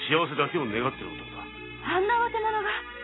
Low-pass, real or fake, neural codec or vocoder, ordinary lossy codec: 7.2 kHz; real; none; AAC, 16 kbps